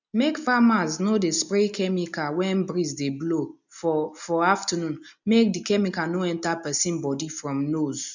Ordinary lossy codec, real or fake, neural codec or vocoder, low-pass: none; real; none; 7.2 kHz